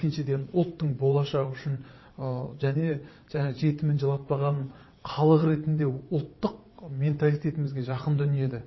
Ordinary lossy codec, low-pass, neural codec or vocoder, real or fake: MP3, 24 kbps; 7.2 kHz; vocoder, 22.05 kHz, 80 mel bands, WaveNeXt; fake